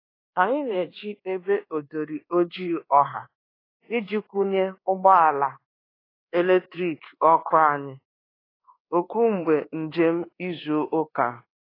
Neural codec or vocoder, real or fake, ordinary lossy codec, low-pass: codec, 24 kHz, 1.2 kbps, DualCodec; fake; AAC, 24 kbps; 5.4 kHz